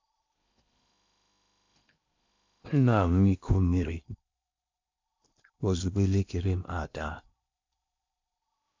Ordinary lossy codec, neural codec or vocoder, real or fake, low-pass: MP3, 64 kbps; codec, 16 kHz in and 24 kHz out, 0.8 kbps, FocalCodec, streaming, 65536 codes; fake; 7.2 kHz